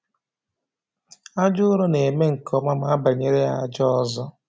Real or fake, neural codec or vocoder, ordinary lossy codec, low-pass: real; none; none; none